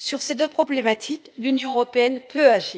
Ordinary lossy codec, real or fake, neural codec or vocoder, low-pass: none; fake; codec, 16 kHz, 0.8 kbps, ZipCodec; none